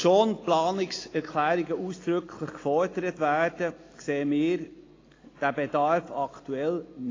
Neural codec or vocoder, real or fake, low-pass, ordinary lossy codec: none; real; 7.2 kHz; AAC, 32 kbps